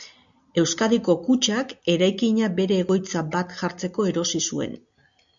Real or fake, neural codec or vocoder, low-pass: real; none; 7.2 kHz